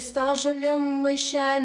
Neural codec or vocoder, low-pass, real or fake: codec, 24 kHz, 0.9 kbps, WavTokenizer, medium music audio release; 10.8 kHz; fake